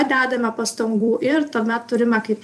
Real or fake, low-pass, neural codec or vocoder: fake; 14.4 kHz; vocoder, 44.1 kHz, 128 mel bands every 256 samples, BigVGAN v2